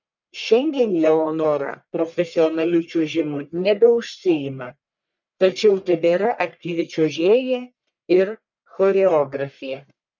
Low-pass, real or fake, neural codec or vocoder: 7.2 kHz; fake; codec, 44.1 kHz, 1.7 kbps, Pupu-Codec